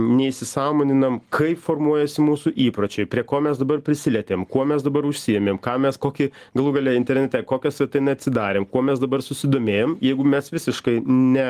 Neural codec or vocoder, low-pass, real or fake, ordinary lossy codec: none; 14.4 kHz; real; Opus, 24 kbps